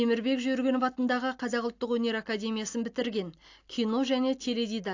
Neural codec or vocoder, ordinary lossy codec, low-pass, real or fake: none; AAC, 48 kbps; 7.2 kHz; real